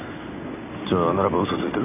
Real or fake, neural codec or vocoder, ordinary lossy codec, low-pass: real; none; none; 3.6 kHz